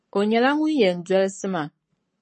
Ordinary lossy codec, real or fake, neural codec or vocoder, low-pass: MP3, 32 kbps; fake; codec, 44.1 kHz, 7.8 kbps, Pupu-Codec; 10.8 kHz